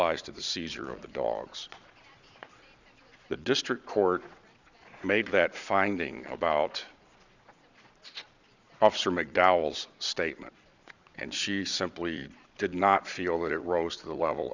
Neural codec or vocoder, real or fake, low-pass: vocoder, 22.05 kHz, 80 mel bands, Vocos; fake; 7.2 kHz